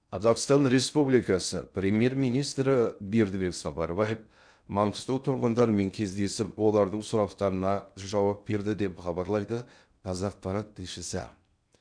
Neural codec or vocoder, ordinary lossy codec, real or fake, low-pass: codec, 16 kHz in and 24 kHz out, 0.6 kbps, FocalCodec, streaming, 4096 codes; none; fake; 9.9 kHz